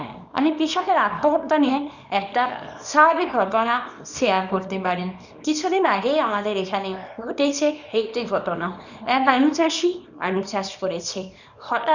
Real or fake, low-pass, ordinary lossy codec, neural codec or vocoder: fake; 7.2 kHz; none; codec, 24 kHz, 0.9 kbps, WavTokenizer, small release